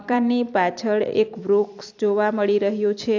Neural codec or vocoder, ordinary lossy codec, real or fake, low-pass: vocoder, 44.1 kHz, 128 mel bands every 256 samples, BigVGAN v2; AAC, 48 kbps; fake; 7.2 kHz